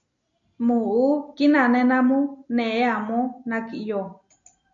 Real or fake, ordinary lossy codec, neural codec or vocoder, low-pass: real; MP3, 48 kbps; none; 7.2 kHz